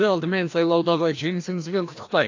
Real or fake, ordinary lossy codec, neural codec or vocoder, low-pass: fake; AAC, 48 kbps; codec, 16 kHz, 1 kbps, FreqCodec, larger model; 7.2 kHz